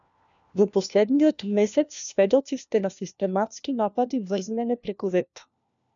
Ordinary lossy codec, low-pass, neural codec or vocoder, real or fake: MP3, 96 kbps; 7.2 kHz; codec, 16 kHz, 1 kbps, FunCodec, trained on LibriTTS, 50 frames a second; fake